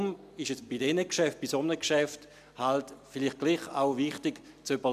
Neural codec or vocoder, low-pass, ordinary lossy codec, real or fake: none; 14.4 kHz; MP3, 96 kbps; real